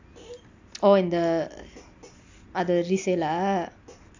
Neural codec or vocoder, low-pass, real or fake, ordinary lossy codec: none; 7.2 kHz; real; AAC, 48 kbps